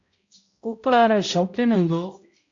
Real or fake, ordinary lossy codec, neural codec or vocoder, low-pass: fake; AAC, 48 kbps; codec, 16 kHz, 0.5 kbps, X-Codec, HuBERT features, trained on balanced general audio; 7.2 kHz